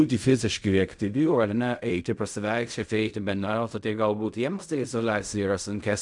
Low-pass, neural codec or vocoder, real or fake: 10.8 kHz; codec, 16 kHz in and 24 kHz out, 0.4 kbps, LongCat-Audio-Codec, fine tuned four codebook decoder; fake